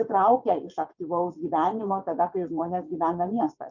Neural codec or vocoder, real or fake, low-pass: vocoder, 22.05 kHz, 80 mel bands, WaveNeXt; fake; 7.2 kHz